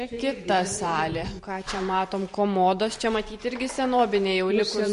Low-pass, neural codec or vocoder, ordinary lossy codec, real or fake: 14.4 kHz; vocoder, 44.1 kHz, 128 mel bands every 512 samples, BigVGAN v2; MP3, 48 kbps; fake